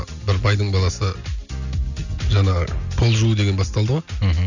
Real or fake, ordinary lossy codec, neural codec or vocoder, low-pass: real; none; none; 7.2 kHz